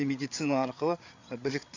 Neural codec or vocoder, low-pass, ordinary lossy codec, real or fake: codec, 16 kHz, 8 kbps, FreqCodec, larger model; 7.2 kHz; AAC, 48 kbps; fake